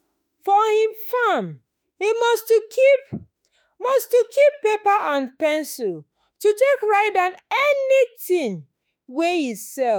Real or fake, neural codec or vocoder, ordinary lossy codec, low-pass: fake; autoencoder, 48 kHz, 32 numbers a frame, DAC-VAE, trained on Japanese speech; none; none